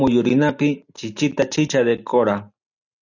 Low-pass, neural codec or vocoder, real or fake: 7.2 kHz; none; real